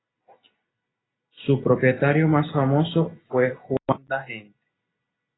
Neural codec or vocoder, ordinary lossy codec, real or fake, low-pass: none; AAC, 16 kbps; real; 7.2 kHz